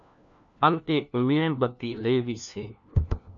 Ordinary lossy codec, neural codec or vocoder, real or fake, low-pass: MP3, 96 kbps; codec, 16 kHz, 1 kbps, FunCodec, trained on LibriTTS, 50 frames a second; fake; 7.2 kHz